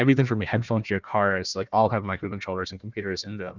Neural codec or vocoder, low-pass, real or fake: codec, 16 kHz, 1 kbps, FunCodec, trained on Chinese and English, 50 frames a second; 7.2 kHz; fake